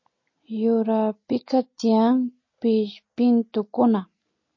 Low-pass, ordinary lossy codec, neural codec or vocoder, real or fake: 7.2 kHz; MP3, 32 kbps; none; real